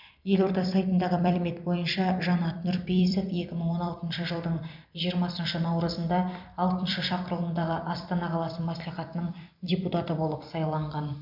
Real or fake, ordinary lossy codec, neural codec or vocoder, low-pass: fake; AAC, 48 kbps; vocoder, 44.1 kHz, 128 mel bands every 256 samples, BigVGAN v2; 5.4 kHz